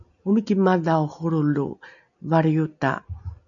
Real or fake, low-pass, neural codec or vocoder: real; 7.2 kHz; none